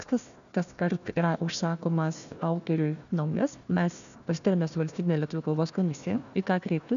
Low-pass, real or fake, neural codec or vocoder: 7.2 kHz; fake; codec, 16 kHz, 1 kbps, FunCodec, trained on Chinese and English, 50 frames a second